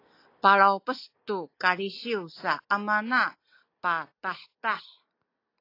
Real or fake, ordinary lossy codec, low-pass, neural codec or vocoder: real; AAC, 32 kbps; 5.4 kHz; none